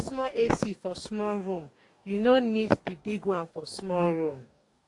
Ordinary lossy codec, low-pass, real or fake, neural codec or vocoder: AAC, 48 kbps; 10.8 kHz; fake; codec, 44.1 kHz, 2.6 kbps, DAC